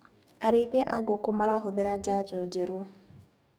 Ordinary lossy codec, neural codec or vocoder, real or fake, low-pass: none; codec, 44.1 kHz, 2.6 kbps, DAC; fake; none